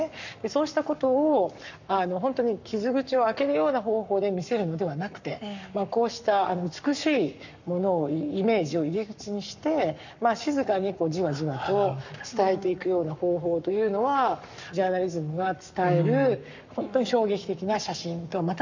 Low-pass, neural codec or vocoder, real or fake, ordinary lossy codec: 7.2 kHz; codec, 44.1 kHz, 7.8 kbps, Pupu-Codec; fake; none